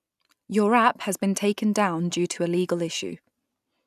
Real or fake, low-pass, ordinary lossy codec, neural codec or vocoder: real; 14.4 kHz; none; none